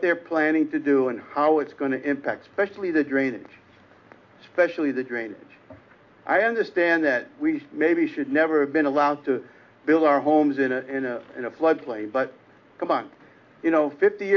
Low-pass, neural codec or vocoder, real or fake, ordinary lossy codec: 7.2 kHz; none; real; AAC, 48 kbps